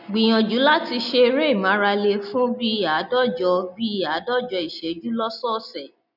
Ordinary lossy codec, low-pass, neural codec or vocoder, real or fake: none; 5.4 kHz; none; real